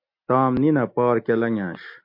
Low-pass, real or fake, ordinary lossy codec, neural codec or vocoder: 5.4 kHz; real; MP3, 32 kbps; none